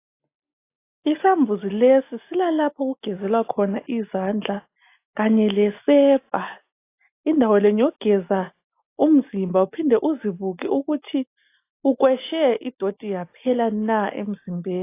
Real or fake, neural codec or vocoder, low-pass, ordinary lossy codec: real; none; 3.6 kHz; AAC, 24 kbps